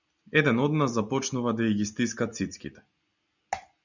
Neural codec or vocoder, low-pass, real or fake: none; 7.2 kHz; real